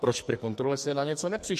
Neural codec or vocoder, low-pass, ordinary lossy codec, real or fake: codec, 44.1 kHz, 2.6 kbps, SNAC; 14.4 kHz; MP3, 64 kbps; fake